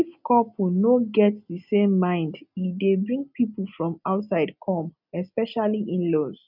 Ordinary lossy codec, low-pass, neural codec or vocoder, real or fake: none; 5.4 kHz; none; real